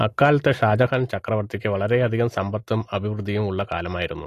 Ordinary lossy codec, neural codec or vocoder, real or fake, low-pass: AAC, 48 kbps; none; real; 14.4 kHz